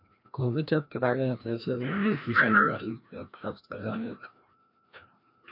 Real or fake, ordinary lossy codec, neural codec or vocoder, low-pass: fake; MP3, 48 kbps; codec, 16 kHz, 1 kbps, FreqCodec, larger model; 5.4 kHz